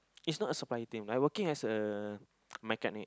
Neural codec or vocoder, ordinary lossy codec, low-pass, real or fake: none; none; none; real